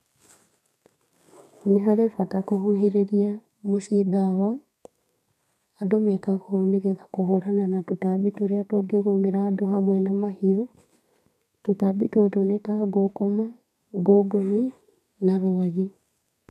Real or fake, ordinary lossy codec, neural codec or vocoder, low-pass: fake; none; codec, 32 kHz, 1.9 kbps, SNAC; 14.4 kHz